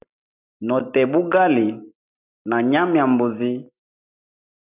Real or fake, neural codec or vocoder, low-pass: real; none; 3.6 kHz